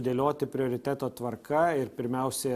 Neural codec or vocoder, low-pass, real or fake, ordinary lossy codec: none; 14.4 kHz; real; Opus, 64 kbps